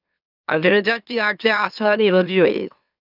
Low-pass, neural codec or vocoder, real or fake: 5.4 kHz; autoencoder, 44.1 kHz, a latent of 192 numbers a frame, MeloTTS; fake